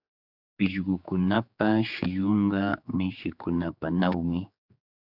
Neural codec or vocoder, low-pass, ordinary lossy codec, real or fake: codec, 16 kHz, 4 kbps, X-Codec, HuBERT features, trained on general audio; 5.4 kHz; Opus, 64 kbps; fake